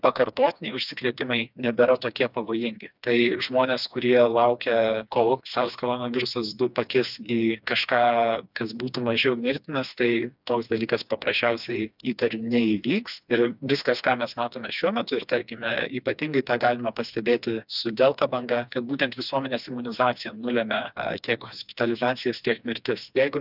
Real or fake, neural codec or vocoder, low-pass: fake; codec, 16 kHz, 2 kbps, FreqCodec, smaller model; 5.4 kHz